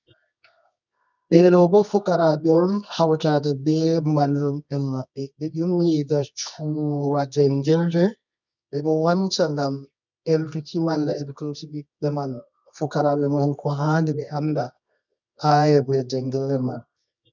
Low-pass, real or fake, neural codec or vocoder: 7.2 kHz; fake; codec, 24 kHz, 0.9 kbps, WavTokenizer, medium music audio release